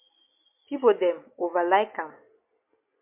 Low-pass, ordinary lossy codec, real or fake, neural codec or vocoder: 3.6 kHz; MP3, 32 kbps; real; none